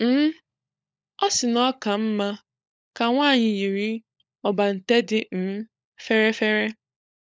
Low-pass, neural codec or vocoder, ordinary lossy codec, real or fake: none; codec, 16 kHz, 16 kbps, FunCodec, trained on LibriTTS, 50 frames a second; none; fake